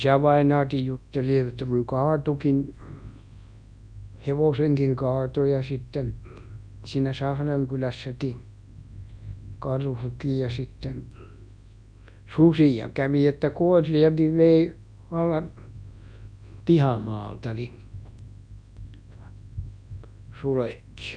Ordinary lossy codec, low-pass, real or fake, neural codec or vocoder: Opus, 64 kbps; 9.9 kHz; fake; codec, 24 kHz, 0.9 kbps, WavTokenizer, large speech release